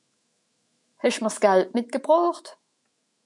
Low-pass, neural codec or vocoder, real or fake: 10.8 kHz; autoencoder, 48 kHz, 128 numbers a frame, DAC-VAE, trained on Japanese speech; fake